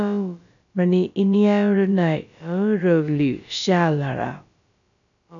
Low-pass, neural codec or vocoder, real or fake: 7.2 kHz; codec, 16 kHz, about 1 kbps, DyCAST, with the encoder's durations; fake